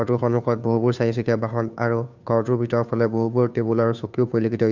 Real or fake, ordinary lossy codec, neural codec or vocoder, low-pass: fake; none; codec, 16 kHz, 2 kbps, FunCodec, trained on Chinese and English, 25 frames a second; 7.2 kHz